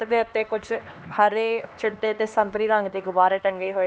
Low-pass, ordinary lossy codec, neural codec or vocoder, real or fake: none; none; codec, 16 kHz, 2 kbps, X-Codec, HuBERT features, trained on LibriSpeech; fake